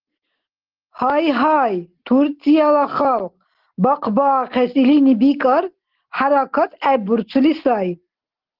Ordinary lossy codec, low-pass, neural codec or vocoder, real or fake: Opus, 16 kbps; 5.4 kHz; none; real